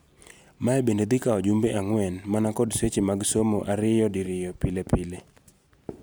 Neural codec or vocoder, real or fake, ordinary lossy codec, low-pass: none; real; none; none